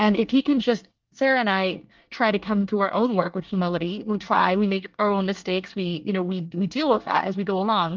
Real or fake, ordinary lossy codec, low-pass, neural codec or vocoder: fake; Opus, 24 kbps; 7.2 kHz; codec, 24 kHz, 1 kbps, SNAC